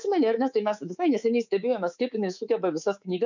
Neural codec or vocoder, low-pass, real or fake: codec, 24 kHz, 3.1 kbps, DualCodec; 7.2 kHz; fake